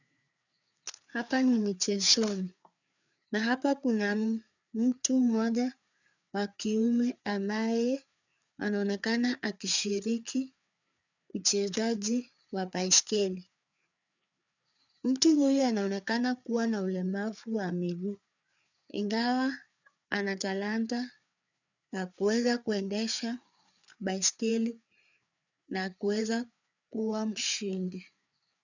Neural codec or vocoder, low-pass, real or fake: codec, 16 kHz, 4 kbps, FreqCodec, larger model; 7.2 kHz; fake